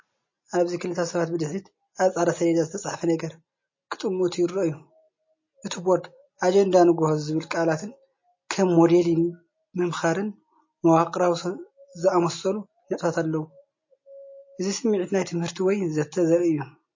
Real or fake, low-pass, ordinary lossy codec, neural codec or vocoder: real; 7.2 kHz; MP3, 32 kbps; none